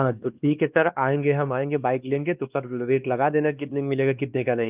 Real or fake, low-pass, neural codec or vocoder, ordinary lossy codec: fake; 3.6 kHz; codec, 16 kHz, 2 kbps, X-Codec, WavLM features, trained on Multilingual LibriSpeech; Opus, 32 kbps